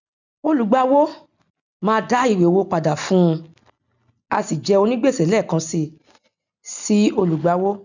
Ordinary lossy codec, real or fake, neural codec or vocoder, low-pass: none; real; none; 7.2 kHz